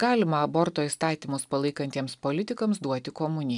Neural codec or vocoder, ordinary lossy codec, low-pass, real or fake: none; MP3, 96 kbps; 10.8 kHz; real